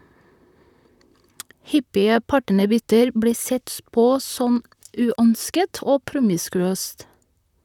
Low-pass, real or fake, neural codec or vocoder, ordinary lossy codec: 19.8 kHz; fake; vocoder, 44.1 kHz, 128 mel bands, Pupu-Vocoder; none